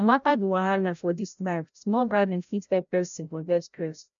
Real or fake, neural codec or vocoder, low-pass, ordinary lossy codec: fake; codec, 16 kHz, 0.5 kbps, FreqCodec, larger model; 7.2 kHz; none